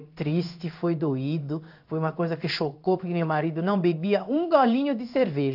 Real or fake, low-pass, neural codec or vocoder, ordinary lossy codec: fake; 5.4 kHz; codec, 16 kHz in and 24 kHz out, 1 kbps, XY-Tokenizer; AAC, 48 kbps